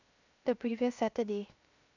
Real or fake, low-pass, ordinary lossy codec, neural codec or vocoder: fake; 7.2 kHz; none; codec, 16 kHz, 0.7 kbps, FocalCodec